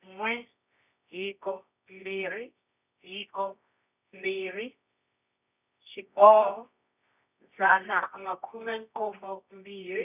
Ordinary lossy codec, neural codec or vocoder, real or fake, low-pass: none; codec, 24 kHz, 0.9 kbps, WavTokenizer, medium music audio release; fake; 3.6 kHz